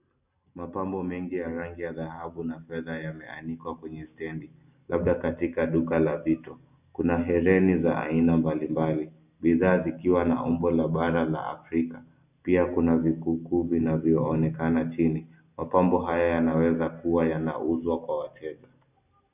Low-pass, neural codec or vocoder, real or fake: 3.6 kHz; none; real